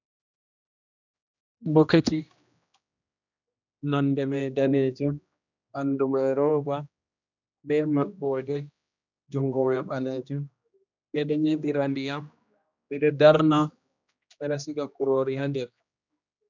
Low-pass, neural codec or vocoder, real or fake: 7.2 kHz; codec, 16 kHz, 1 kbps, X-Codec, HuBERT features, trained on general audio; fake